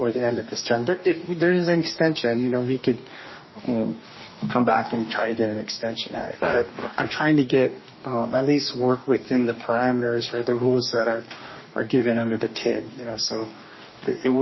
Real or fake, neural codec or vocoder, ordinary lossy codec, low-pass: fake; codec, 44.1 kHz, 2.6 kbps, DAC; MP3, 24 kbps; 7.2 kHz